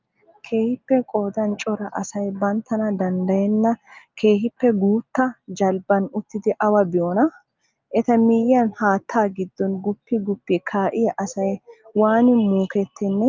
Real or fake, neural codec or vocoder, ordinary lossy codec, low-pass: real; none; Opus, 24 kbps; 7.2 kHz